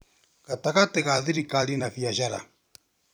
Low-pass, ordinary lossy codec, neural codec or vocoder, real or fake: none; none; vocoder, 44.1 kHz, 128 mel bands every 256 samples, BigVGAN v2; fake